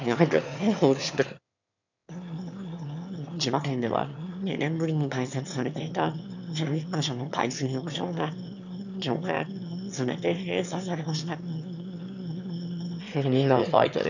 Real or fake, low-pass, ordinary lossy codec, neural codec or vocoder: fake; 7.2 kHz; none; autoencoder, 22.05 kHz, a latent of 192 numbers a frame, VITS, trained on one speaker